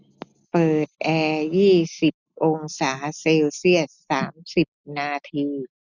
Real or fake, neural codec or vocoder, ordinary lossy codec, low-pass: real; none; none; 7.2 kHz